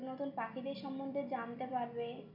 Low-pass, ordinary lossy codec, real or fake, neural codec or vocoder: 5.4 kHz; none; real; none